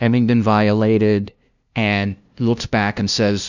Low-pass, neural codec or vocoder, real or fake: 7.2 kHz; codec, 16 kHz, 0.5 kbps, FunCodec, trained on LibriTTS, 25 frames a second; fake